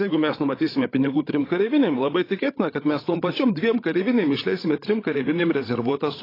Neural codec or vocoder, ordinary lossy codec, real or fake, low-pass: codec, 16 kHz, 16 kbps, FreqCodec, larger model; AAC, 24 kbps; fake; 5.4 kHz